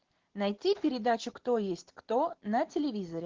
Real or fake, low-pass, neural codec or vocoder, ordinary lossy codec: real; 7.2 kHz; none; Opus, 16 kbps